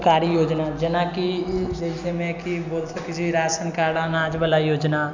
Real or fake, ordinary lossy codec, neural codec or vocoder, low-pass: real; none; none; 7.2 kHz